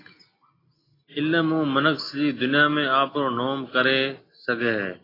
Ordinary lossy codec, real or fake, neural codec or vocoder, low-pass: AAC, 24 kbps; real; none; 5.4 kHz